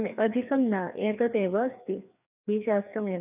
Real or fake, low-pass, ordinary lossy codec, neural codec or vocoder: fake; 3.6 kHz; none; codec, 16 kHz, 2 kbps, FreqCodec, larger model